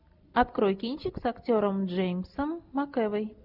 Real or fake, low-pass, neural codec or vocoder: real; 5.4 kHz; none